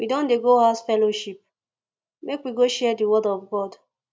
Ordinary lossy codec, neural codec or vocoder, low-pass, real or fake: none; none; none; real